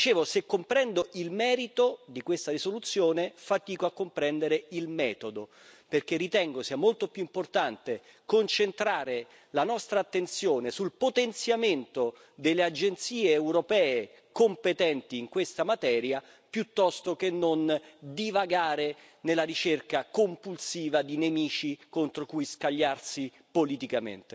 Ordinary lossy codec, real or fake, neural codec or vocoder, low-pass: none; real; none; none